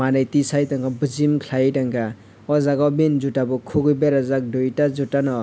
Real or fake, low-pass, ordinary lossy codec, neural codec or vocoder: real; none; none; none